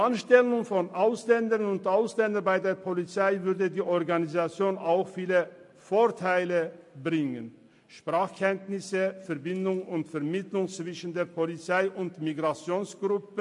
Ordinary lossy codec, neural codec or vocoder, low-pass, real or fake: MP3, 48 kbps; none; 10.8 kHz; real